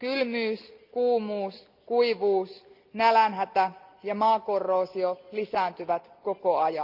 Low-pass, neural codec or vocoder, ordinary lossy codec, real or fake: 5.4 kHz; none; Opus, 32 kbps; real